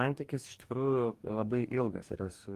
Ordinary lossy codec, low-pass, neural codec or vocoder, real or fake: Opus, 24 kbps; 14.4 kHz; codec, 44.1 kHz, 2.6 kbps, DAC; fake